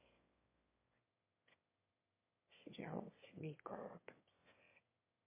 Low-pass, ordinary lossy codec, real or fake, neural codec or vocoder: 3.6 kHz; MP3, 24 kbps; fake; autoencoder, 22.05 kHz, a latent of 192 numbers a frame, VITS, trained on one speaker